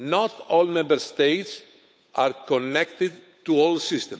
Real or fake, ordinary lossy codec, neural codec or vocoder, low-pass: fake; none; codec, 16 kHz, 8 kbps, FunCodec, trained on Chinese and English, 25 frames a second; none